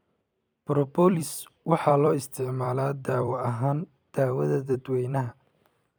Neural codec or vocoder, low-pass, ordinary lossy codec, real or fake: vocoder, 44.1 kHz, 128 mel bands every 256 samples, BigVGAN v2; none; none; fake